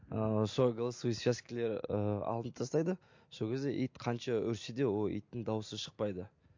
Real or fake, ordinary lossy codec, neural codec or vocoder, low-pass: real; MP3, 48 kbps; none; 7.2 kHz